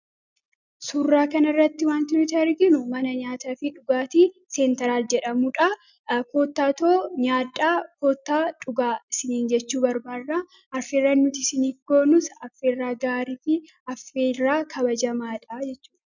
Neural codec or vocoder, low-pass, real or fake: none; 7.2 kHz; real